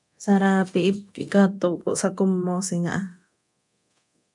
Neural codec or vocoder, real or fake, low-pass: codec, 24 kHz, 0.9 kbps, DualCodec; fake; 10.8 kHz